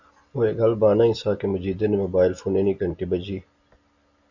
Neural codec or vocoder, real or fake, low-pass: none; real; 7.2 kHz